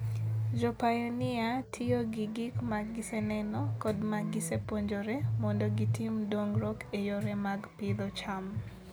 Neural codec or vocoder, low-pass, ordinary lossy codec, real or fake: none; none; none; real